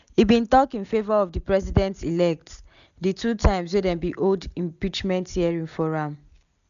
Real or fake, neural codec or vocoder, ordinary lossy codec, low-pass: real; none; none; 7.2 kHz